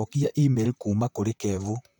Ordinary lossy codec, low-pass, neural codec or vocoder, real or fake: none; none; vocoder, 44.1 kHz, 128 mel bands, Pupu-Vocoder; fake